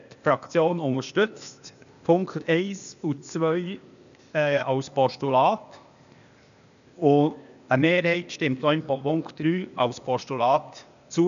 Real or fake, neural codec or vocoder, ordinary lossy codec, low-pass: fake; codec, 16 kHz, 0.8 kbps, ZipCodec; none; 7.2 kHz